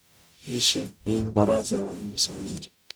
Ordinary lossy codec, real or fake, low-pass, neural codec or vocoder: none; fake; none; codec, 44.1 kHz, 0.9 kbps, DAC